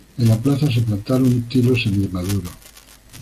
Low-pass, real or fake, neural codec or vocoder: 14.4 kHz; real; none